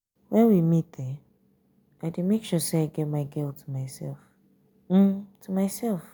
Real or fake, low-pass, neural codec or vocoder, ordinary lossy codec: real; none; none; none